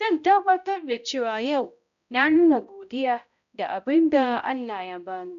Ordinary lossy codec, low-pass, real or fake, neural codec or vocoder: none; 7.2 kHz; fake; codec, 16 kHz, 0.5 kbps, X-Codec, HuBERT features, trained on balanced general audio